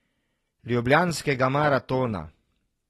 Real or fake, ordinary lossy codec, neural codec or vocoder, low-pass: real; AAC, 32 kbps; none; 19.8 kHz